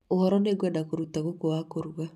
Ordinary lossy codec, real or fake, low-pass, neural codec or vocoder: none; fake; 14.4 kHz; vocoder, 44.1 kHz, 128 mel bands every 512 samples, BigVGAN v2